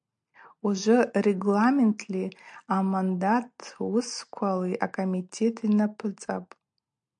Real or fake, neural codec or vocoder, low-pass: real; none; 9.9 kHz